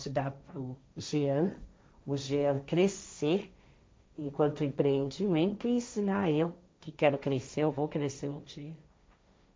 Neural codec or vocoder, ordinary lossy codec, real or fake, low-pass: codec, 16 kHz, 1.1 kbps, Voila-Tokenizer; none; fake; none